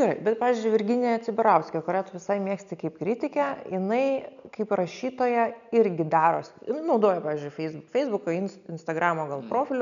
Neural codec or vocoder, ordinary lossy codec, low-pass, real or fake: none; MP3, 96 kbps; 7.2 kHz; real